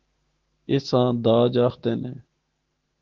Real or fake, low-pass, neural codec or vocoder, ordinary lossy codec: fake; 7.2 kHz; autoencoder, 48 kHz, 128 numbers a frame, DAC-VAE, trained on Japanese speech; Opus, 16 kbps